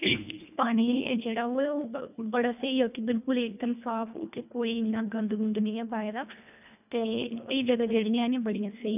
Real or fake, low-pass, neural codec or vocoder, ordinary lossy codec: fake; 3.6 kHz; codec, 24 kHz, 1.5 kbps, HILCodec; none